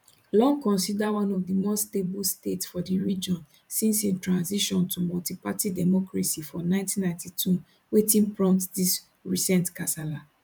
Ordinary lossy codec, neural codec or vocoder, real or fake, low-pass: none; vocoder, 44.1 kHz, 128 mel bands every 512 samples, BigVGAN v2; fake; 19.8 kHz